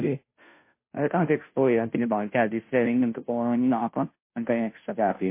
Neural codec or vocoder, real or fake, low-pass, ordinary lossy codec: codec, 16 kHz, 0.5 kbps, FunCodec, trained on Chinese and English, 25 frames a second; fake; 3.6 kHz; MP3, 24 kbps